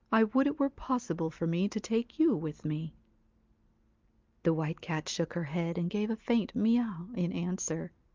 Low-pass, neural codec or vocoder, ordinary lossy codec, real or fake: 7.2 kHz; none; Opus, 24 kbps; real